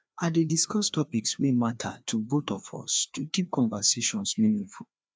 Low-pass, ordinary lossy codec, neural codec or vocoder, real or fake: none; none; codec, 16 kHz, 2 kbps, FreqCodec, larger model; fake